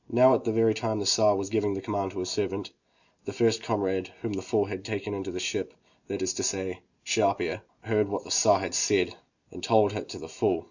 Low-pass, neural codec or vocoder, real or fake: 7.2 kHz; none; real